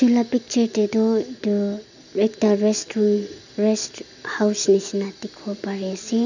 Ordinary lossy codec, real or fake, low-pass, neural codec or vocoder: none; real; 7.2 kHz; none